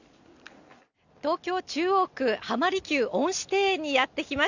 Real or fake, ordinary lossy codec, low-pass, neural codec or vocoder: real; none; 7.2 kHz; none